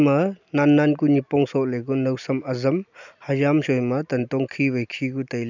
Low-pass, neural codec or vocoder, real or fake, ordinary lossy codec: 7.2 kHz; none; real; none